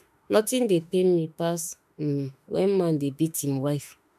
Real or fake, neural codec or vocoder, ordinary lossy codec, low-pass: fake; autoencoder, 48 kHz, 32 numbers a frame, DAC-VAE, trained on Japanese speech; none; 14.4 kHz